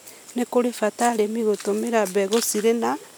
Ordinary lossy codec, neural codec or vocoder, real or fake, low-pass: none; none; real; none